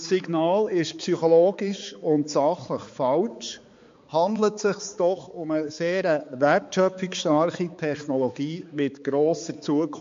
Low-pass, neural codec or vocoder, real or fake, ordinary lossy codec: 7.2 kHz; codec, 16 kHz, 4 kbps, X-Codec, HuBERT features, trained on balanced general audio; fake; MP3, 48 kbps